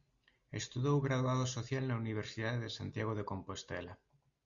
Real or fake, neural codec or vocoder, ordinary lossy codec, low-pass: real; none; Opus, 64 kbps; 7.2 kHz